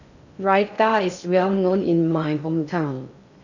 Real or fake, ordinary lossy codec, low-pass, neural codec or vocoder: fake; none; 7.2 kHz; codec, 16 kHz in and 24 kHz out, 0.6 kbps, FocalCodec, streaming, 2048 codes